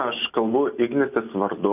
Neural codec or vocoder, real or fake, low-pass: none; real; 3.6 kHz